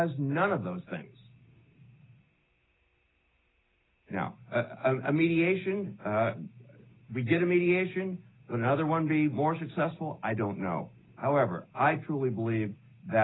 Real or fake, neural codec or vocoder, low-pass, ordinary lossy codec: real; none; 7.2 kHz; AAC, 16 kbps